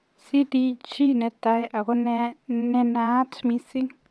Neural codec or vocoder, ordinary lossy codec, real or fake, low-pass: vocoder, 22.05 kHz, 80 mel bands, WaveNeXt; none; fake; none